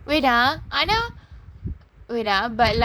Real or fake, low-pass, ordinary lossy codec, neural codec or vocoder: fake; none; none; vocoder, 44.1 kHz, 128 mel bands, Pupu-Vocoder